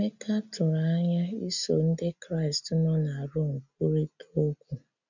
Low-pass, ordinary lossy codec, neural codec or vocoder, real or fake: 7.2 kHz; none; none; real